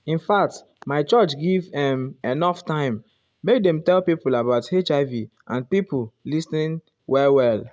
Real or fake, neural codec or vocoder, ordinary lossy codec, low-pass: real; none; none; none